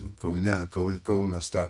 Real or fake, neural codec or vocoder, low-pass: fake; codec, 24 kHz, 0.9 kbps, WavTokenizer, medium music audio release; 10.8 kHz